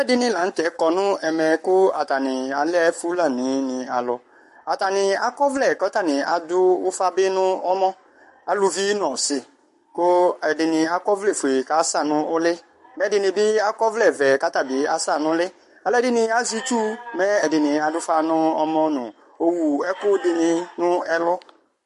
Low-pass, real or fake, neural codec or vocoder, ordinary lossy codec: 14.4 kHz; fake; codec, 44.1 kHz, 7.8 kbps, Pupu-Codec; MP3, 48 kbps